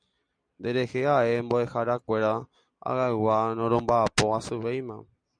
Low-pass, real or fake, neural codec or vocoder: 9.9 kHz; real; none